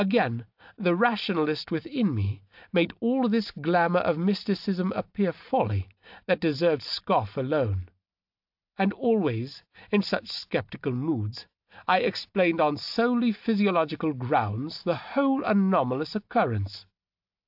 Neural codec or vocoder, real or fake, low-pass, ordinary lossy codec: none; real; 5.4 kHz; AAC, 48 kbps